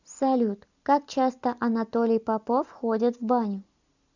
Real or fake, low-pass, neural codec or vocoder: real; 7.2 kHz; none